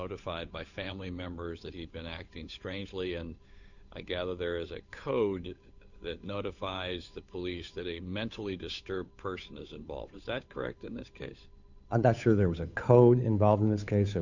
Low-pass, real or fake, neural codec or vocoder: 7.2 kHz; fake; codec, 16 kHz, 8 kbps, FunCodec, trained on Chinese and English, 25 frames a second